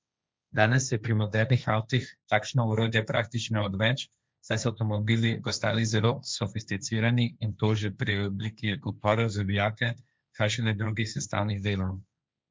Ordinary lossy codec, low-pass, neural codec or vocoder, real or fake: none; none; codec, 16 kHz, 1.1 kbps, Voila-Tokenizer; fake